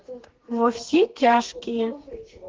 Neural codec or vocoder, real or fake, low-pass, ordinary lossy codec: codec, 32 kHz, 1.9 kbps, SNAC; fake; 7.2 kHz; Opus, 16 kbps